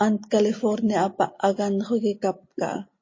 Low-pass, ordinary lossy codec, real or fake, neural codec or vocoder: 7.2 kHz; MP3, 32 kbps; real; none